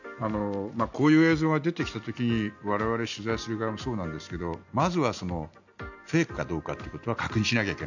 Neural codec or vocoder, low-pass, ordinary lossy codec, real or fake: none; 7.2 kHz; none; real